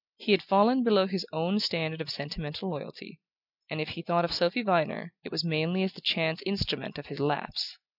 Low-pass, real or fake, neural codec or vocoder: 5.4 kHz; real; none